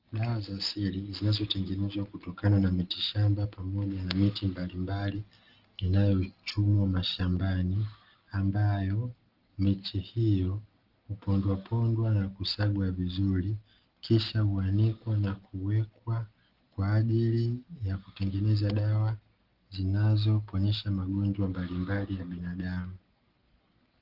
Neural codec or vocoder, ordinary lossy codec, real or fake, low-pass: none; Opus, 16 kbps; real; 5.4 kHz